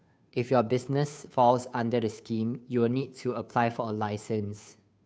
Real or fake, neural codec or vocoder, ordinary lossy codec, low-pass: fake; codec, 16 kHz, 2 kbps, FunCodec, trained on Chinese and English, 25 frames a second; none; none